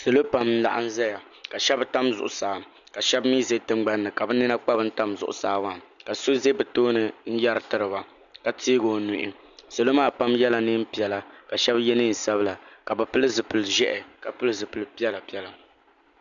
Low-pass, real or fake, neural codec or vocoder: 7.2 kHz; real; none